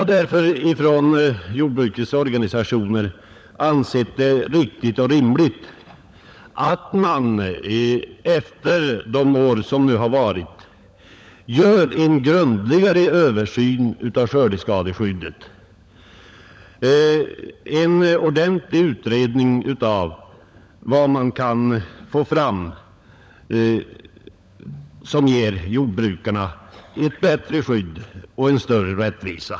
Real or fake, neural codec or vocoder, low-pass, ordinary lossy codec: fake; codec, 16 kHz, 16 kbps, FunCodec, trained on LibriTTS, 50 frames a second; none; none